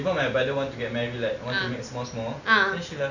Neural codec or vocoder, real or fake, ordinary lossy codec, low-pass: none; real; none; 7.2 kHz